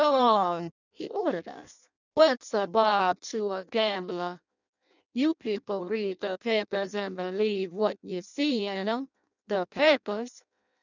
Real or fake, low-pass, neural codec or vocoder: fake; 7.2 kHz; codec, 16 kHz in and 24 kHz out, 0.6 kbps, FireRedTTS-2 codec